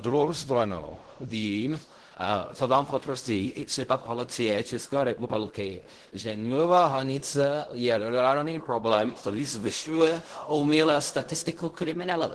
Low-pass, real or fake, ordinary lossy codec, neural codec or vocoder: 10.8 kHz; fake; Opus, 16 kbps; codec, 16 kHz in and 24 kHz out, 0.4 kbps, LongCat-Audio-Codec, fine tuned four codebook decoder